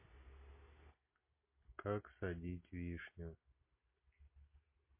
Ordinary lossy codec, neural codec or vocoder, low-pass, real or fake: MP3, 24 kbps; none; 3.6 kHz; real